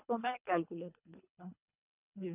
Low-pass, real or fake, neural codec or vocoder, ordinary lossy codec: 3.6 kHz; fake; codec, 24 kHz, 3 kbps, HILCodec; none